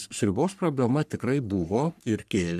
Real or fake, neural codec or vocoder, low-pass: fake; codec, 44.1 kHz, 3.4 kbps, Pupu-Codec; 14.4 kHz